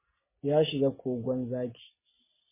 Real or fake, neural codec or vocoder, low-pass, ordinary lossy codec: real; none; 3.6 kHz; MP3, 16 kbps